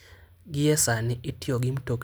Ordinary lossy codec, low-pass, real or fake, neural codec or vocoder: none; none; real; none